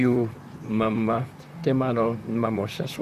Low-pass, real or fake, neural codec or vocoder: 14.4 kHz; fake; vocoder, 44.1 kHz, 128 mel bands, Pupu-Vocoder